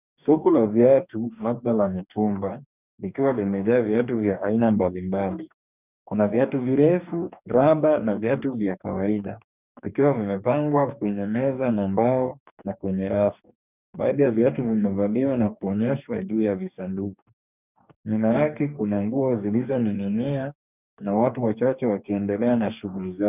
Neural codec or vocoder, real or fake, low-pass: codec, 44.1 kHz, 2.6 kbps, DAC; fake; 3.6 kHz